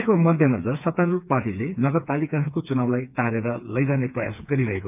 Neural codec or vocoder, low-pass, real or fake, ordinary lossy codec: codec, 16 kHz, 4 kbps, FreqCodec, smaller model; 3.6 kHz; fake; none